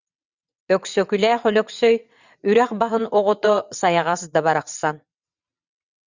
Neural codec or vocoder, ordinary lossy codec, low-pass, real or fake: vocoder, 22.05 kHz, 80 mel bands, WaveNeXt; Opus, 64 kbps; 7.2 kHz; fake